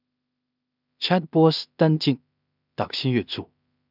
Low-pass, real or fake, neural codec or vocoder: 5.4 kHz; fake; codec, 16 kHz in and 24 kHz out, 0.4 kbps, LongCat-Audio-Codec, two codebook decoder